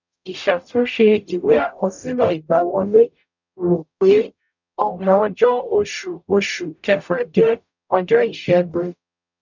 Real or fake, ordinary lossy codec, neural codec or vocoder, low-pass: fake; none; codec, 44.1 kHz, 0.9 kbps, DAC; 7.2 kHz